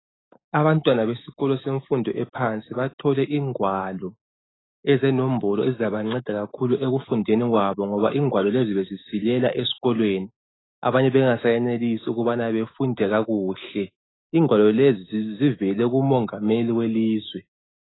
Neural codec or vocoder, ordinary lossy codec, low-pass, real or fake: autoencoder, 48 kHz, 128 numbers a frame, DAC-VAE, trained on Japanese speech; AAC, 16 kbps; 7.2 kHz; fake